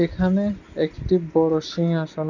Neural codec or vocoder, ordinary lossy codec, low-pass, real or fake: none; none; 7.2 kHz; real